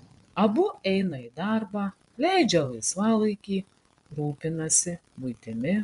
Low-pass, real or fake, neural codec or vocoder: 10.8 kHz; fake; vocoder, 24 kHz, 100 mel bands, Vocos